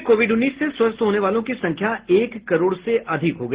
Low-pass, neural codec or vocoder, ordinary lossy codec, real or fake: 3.6 kHz; none; Opus, 16 kbps; real